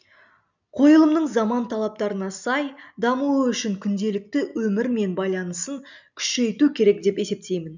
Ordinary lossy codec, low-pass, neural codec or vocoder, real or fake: none; 7.2 kHz; none; real